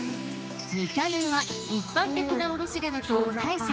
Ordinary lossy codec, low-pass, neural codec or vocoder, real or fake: none; none; codec, 16 kHz, 2 kbps, X-Codec, HuBERT features, trained on general audio; fake